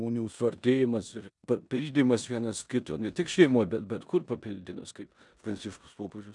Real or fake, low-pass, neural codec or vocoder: fake; 10.8 kHz; codec, 16 kHz in and 24 kHz out, 0.9 kbps, LongCat-Audio-Codec, four codebook decoder